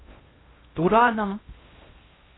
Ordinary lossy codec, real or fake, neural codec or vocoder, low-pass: AAC, 16 kbps; fake; codec, 16 kHz in and 24 kHz out, 0.6 kbps, FocalCodec, streaming, 2048 codes; 7.2 kHz